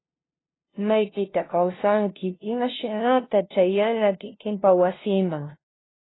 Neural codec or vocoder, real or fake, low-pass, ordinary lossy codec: codec, 16 kHz, 0.5 kbps, FunCodec, trained on LibriTTS, 25 frames a second; fake; 7.2 kHz; AAC, 16 kbps